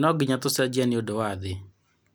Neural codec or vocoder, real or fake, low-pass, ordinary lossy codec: none; real; none; none